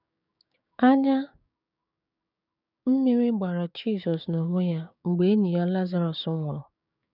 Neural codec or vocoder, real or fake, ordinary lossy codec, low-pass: codec, 16 kHz, 6 kbps, DAC; fake; none; 5.4 kHz